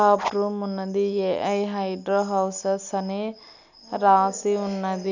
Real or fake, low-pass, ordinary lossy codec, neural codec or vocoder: real; 7.2 kHz; none; none